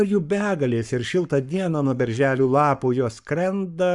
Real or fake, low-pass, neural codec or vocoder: fake; 10.8 kHz; codec, 44.1 kHz, 7.8 kbps, Pupu-Codec